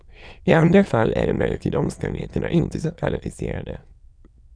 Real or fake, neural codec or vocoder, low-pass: fake; autoencoder, 22.05 kHz, a latent of 192 numbers a frame, VITS, trained on many speakers; 9.9 kHz